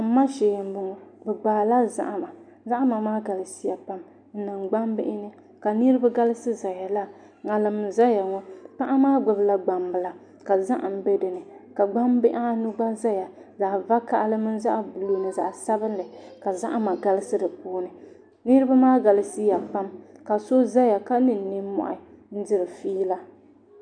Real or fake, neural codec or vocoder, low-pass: real; none; 9.9 kHz